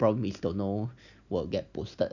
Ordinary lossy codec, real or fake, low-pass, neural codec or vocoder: none; real; 7.2 kHz; none